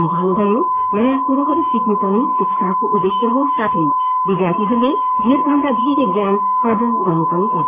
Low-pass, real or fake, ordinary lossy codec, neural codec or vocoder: 3.6 kHz; fake; AAC, 32 kbps; codec, 44.1 kHz, 3.4 kbps, Pupu-Codec